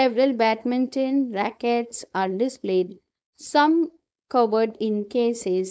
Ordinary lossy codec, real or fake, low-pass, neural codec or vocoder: none; fake; none; codec, 16 kHz, 4.8 kbps, FACodec